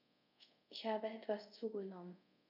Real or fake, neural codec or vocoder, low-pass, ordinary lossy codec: fake; codec, 24 kHz, 0.5 kbps, DualCodec; 5.4 kHz; none